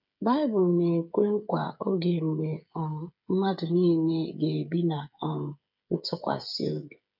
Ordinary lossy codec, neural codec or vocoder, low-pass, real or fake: none; codec, 16 kHz, 8 kbps, FreqCodec, smaller model; 5.4 kHz; fake